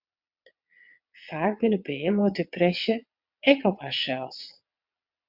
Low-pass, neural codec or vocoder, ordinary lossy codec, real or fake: 5.4 kHz; vocoder, 22.05 kHz, 80 mel bands, WaveNeXt; MP3, 48 kbps; fake